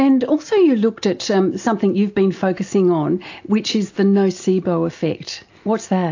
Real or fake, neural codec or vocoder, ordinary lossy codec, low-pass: real; none; AAC, 48 kbps; 7.2 kHz